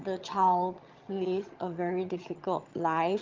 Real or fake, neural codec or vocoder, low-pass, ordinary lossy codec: fake; vocoder, 22.05 kHz, 80 mel bands, HiFi-GAN; 7.2 kHz; Opus, 32 kbps